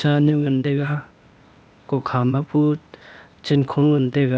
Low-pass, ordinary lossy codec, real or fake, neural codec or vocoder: none; none; fake; codec, 16 kHz, 0.8 kbps, ZipCodec